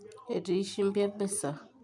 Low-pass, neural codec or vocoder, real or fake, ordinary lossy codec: none; none; real; none